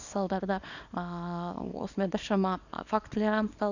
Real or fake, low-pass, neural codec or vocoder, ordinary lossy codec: fake; 7.2 kHz; codec, 24 kHz, 0.9 kbps, WavTokenizer, small release; none